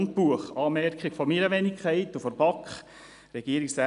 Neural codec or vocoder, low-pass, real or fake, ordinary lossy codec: none; 10.8 kHz; real; none